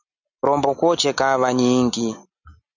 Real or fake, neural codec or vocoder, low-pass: real; none; 7.2 kHz